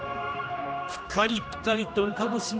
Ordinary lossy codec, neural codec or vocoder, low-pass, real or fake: none; codec, 16 kHz, 1 kbps, X-Codec, HuBERT features, trained on general audio; none; fake